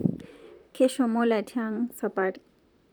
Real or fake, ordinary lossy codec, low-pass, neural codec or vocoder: fake; none; none; vocoder, 44.1 kHz, 128 mel bands, Pupu-Vocoder